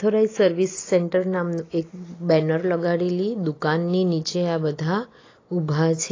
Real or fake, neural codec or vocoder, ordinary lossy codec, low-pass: real; none; AAC, 32 kbps; 7.2 kHz